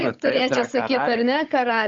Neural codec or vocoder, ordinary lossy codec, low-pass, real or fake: codec, 16 kHz, 16 kbps, FunCodec, trained on Chinese and English, 50 frames a second; Opus, 32 kbps; 7.2 kHz; fake